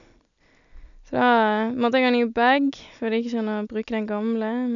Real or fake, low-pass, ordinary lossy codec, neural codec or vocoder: real; 7.2 kHz; none; none